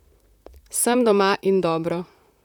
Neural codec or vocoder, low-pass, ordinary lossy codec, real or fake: vocoder, 44.1 kHz, 128 mel bands, Pupu-Vocoder; 19.8 kHz; none; fake